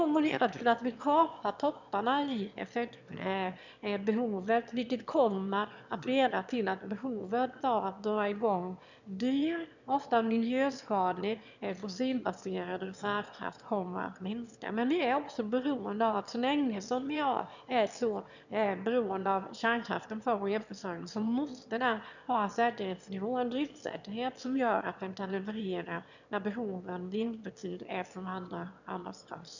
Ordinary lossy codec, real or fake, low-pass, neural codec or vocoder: none; fake; 7.2 kHz; autoencoder, 22.05 kHz, a latent of 192 numbers a frame, VITS, trained on one speaker